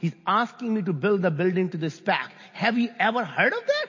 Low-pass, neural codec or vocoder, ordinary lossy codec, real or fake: 7.2 kHz; none; MP3, 32 kbps; real